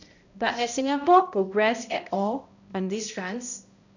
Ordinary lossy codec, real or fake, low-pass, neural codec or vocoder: none; fake; 7.2 kHz; codec, 16 kHz, 0.5 kbps, X-Codec, HuBERT features, trained on balanced general audio